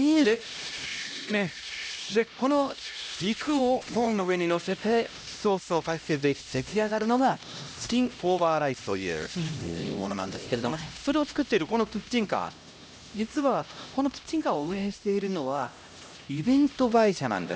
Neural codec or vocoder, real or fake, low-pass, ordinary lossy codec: codec, 16 kHz, 1 kbps, X-Codec, HuBERT features, trained on LibriSpeech; fake; none; none